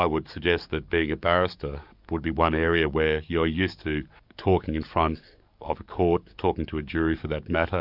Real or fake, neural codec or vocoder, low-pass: fake; codec, 44.1 kHz, 7.8 kbps, DAC; 5.4 kHz